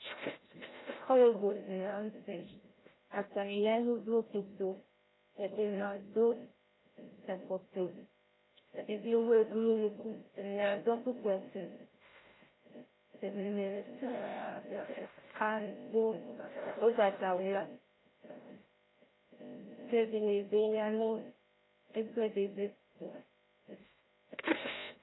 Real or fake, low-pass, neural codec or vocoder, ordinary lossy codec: fake; 7.2 kHz; codec, 16 kHz, 0.5 kbps, FreqCodec, larger model; AAC, 16 kbps